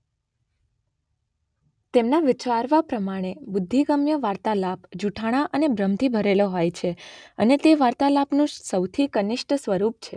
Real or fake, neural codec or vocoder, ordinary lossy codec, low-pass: real; none; none; 9.9 kHz